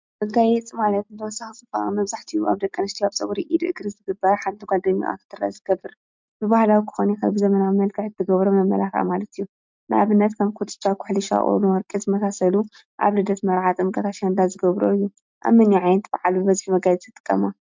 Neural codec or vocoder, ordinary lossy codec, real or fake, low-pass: none; MP3, 64 kbps; real; 7.2 kHz